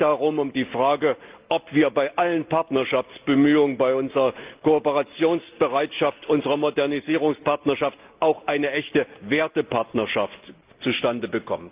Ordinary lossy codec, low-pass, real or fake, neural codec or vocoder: Opus, 24 kbps; 3.6 kHz; real; none